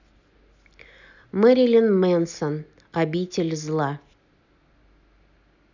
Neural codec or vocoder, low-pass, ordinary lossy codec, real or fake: none; 7.2 kHz; none; real